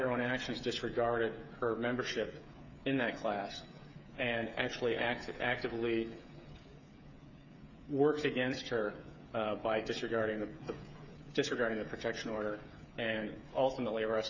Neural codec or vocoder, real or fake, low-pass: codec, 16 kHz, 8 kbps, FreqCodec, smaller model; fake; 7.2 kHz